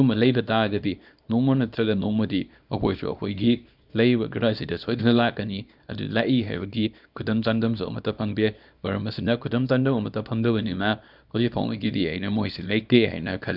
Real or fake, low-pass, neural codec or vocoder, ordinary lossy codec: fake; 5.4 kHz; codec, 24 kHz, 0.9 kbps, WavTokenizer, small release; none